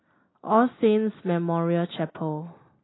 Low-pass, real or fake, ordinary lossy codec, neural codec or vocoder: 7.2 kHz; real; AAC, 16 kbps; none